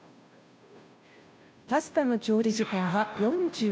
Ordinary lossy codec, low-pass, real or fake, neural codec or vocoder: none; none; fake; codec, 16 kHz, 0.5 kbps, FunCodec, trained on Chinese and English, 25 frames a second